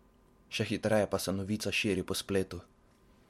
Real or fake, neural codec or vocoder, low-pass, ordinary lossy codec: real; none; 19.8 kHz; MP3, 64 kbps